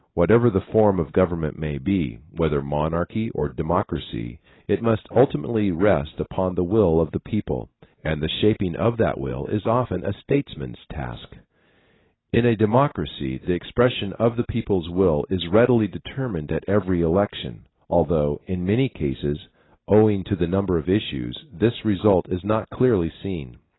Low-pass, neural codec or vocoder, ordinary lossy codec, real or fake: 7.2 kHz; none; AAC, 16 kbps; real